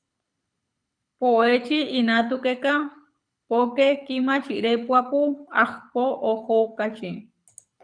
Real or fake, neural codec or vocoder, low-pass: fake; codec, 24 kHz, 6 kbps, HILCodec; 9.9 kHz